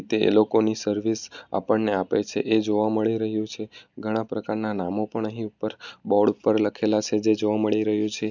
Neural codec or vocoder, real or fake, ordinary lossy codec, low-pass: none; real; none; 7.2 kHz